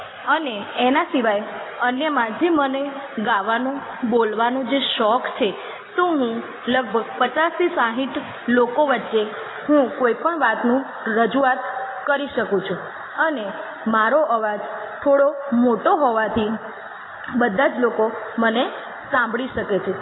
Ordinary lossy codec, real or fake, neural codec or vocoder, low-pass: AAC, 16 kbps; real; none; 7.2 kHz